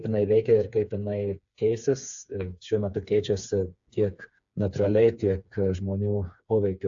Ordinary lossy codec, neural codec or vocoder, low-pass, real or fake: AAC, 64 kbps; codec, 16 kHz, 4 kbps, FreqCodec, smaller model; 7.2 kHz; fake